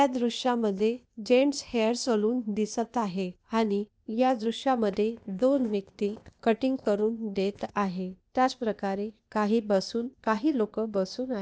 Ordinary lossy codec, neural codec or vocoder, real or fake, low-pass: none; codec, 16 kHz, 0.8 kbps, ZipCodec; fake; none